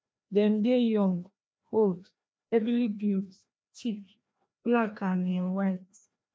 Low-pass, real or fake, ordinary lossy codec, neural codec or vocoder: none; fake; none; codec, 16 kHz, 1 kbps, FreqCodec, larger model